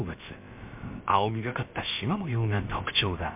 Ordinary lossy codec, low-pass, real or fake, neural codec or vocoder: MP3, 32 kbps; 3.6 kHz; fake; codec, 16 kHz, about 1 kbps, DyCAST, with the encoder's durations